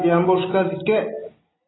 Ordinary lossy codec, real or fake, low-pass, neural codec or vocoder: AAC, 16 kbps; real; 7.2 kHz; none